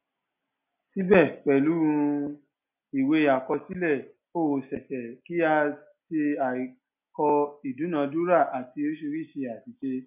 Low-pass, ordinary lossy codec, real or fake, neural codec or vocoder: 3.6 kHz; none; real; none